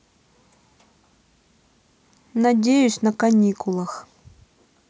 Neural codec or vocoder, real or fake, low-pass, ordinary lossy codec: none; real; none; none